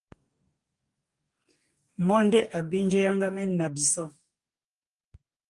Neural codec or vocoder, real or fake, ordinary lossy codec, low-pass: codec, 44.1 kHz, 2.6 kbps, DAC; fake; Opus, 32 kbps; 10.8 kHz